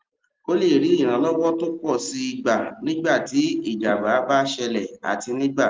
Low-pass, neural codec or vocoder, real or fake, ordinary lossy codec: 7.2 kHz; none; real; Opus, 32 kbps